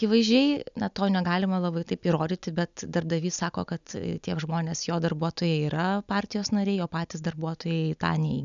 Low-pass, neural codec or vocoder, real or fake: 7.2 kHz; none; real